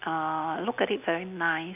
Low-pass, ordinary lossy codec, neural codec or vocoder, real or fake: 3.6 kHz; none; none; real